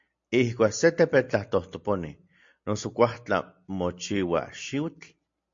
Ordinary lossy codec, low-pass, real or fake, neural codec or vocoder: MP3, 48 kbps; 7.2 kHz; real; none